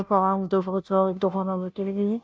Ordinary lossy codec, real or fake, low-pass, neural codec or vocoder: none; fake; none; codec, 16 kHz, 0.5 kbps, FunCodec, trained on Chinese and English, 25 frames a second